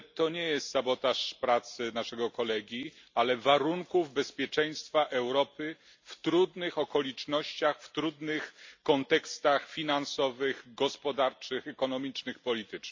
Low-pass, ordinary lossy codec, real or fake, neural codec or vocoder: 7.2 kHz; MP3, 64 kbps; real; none